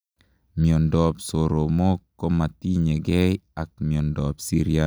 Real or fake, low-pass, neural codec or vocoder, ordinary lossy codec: real; none; none; none